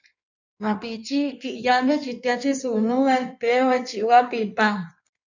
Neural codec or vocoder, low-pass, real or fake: codec, 16 kHz in and 24 kHz out, 1.1 kbps, FireRedTTS-2 codec; 7.2 kHz; fake